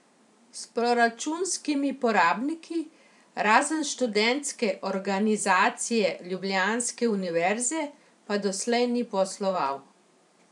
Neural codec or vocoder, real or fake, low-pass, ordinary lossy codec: vocoder, 44.1 kHz, 128 mel bands every 512 samples, BigVGAN v2; fake; 10.8 kHz; none